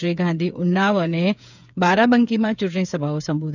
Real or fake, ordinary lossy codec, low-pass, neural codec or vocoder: fake; none; 7.2 kHz; codec, 16 kHz, 8 kbps, FreqCodec, smaller model